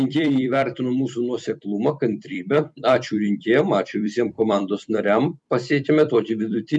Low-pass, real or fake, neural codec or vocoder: 10.8 kHz; fake; vocoder, 44.1 kHz, 128 mel bands every 256 samples, BigVGAN v2